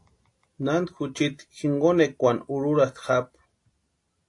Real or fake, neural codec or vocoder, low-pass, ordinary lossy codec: real; none; 10.8 kHz; AAC, 48 kbps